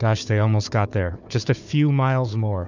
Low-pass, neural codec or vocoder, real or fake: 7.2 kHz; codec, 16 kHz, 4 kbps, FunCodec, trained on Chinese and English, 50 frames a second; fake